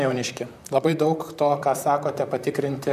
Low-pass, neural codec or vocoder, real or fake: 14.4 kHz; vocoder, 44.1 kHz, 128 mel bands, Pupu-Vocoder; fake